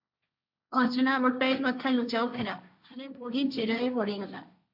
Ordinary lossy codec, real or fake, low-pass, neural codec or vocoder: AAC, 48 kbps; fake; 5.4 kHz; codec, 16 kHz, 1.1 kbps, Voila-Tokenizer